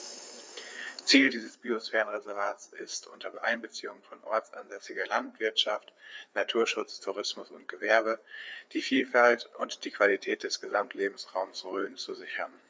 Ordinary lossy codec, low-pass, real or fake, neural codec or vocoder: none; none; fake; codec, 16 kHz, 4 kbps, FreqCodec, larger model